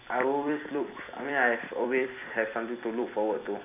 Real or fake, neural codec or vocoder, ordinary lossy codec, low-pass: fake; vocoder, 44.1 kHz, 128 mel bands every 256 samples, BigVGAN v2; MP3, 32 kbps; 3.6 kHz